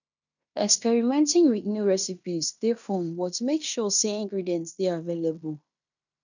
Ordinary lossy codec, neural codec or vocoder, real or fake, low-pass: none; codec, 16 kHz in and 24 kHz out, 0.9 kbps, LongCat-Audio-Codec, fine tuned four codebook decoder; fake; 7.2 kHz